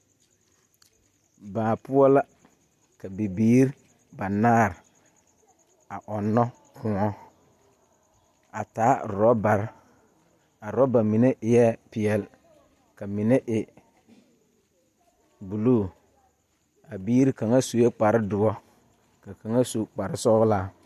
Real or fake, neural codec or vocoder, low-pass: real; none; 14.4 kHz